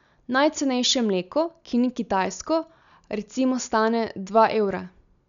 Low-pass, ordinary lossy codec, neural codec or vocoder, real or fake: 7.2 kHz; none; none; real